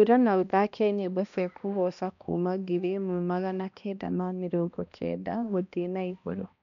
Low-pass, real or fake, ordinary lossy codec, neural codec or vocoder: 7.2 kHz; fake; none; codec, 16 kHz, 1 kbps, X-Codec, HuBERT features, trained on balanced general audio